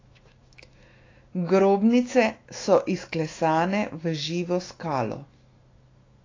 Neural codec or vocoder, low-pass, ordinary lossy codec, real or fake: autoencoder, 48 kHz, 128 numbers a frame, DAC-VAE, trained on Japanese speech; 7.2 kHz; AAC, 32 kbps; fake